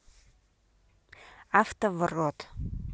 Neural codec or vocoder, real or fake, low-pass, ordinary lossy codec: none; real; none; none